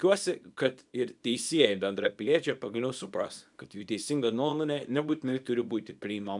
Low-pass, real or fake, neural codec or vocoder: 10.8 kHz; fake; codec, 24 kHz, 0.9 kbps, WavTokenizer, small release